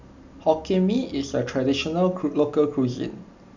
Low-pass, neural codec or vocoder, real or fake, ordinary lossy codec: 7.2 kHz; none; real; none